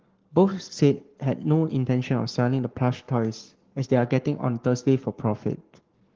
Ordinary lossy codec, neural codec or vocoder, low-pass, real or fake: Opus, 16 kbps; codec, 44.1 kHz, 7.8 kbps, Pupu-Codec; 7.2 kHz; fake